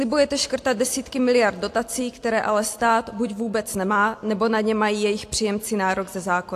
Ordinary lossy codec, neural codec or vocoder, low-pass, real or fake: AAC, 64 kbps; none; 14.4 kHz; real